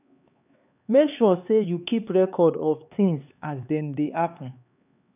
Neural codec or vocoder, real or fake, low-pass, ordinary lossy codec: codec, 16 kHz, 4 kbps, X-Codec, HuBERT features, trained on LibriSpeech; fake; 3.6 kHz; none